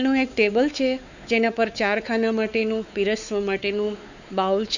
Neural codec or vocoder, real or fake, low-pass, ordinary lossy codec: codec, 16 kHz, 4 kbps, X-Codec, WavLM features, trained on Multilingual LibriSpeech; fake; 7.2 kHz; none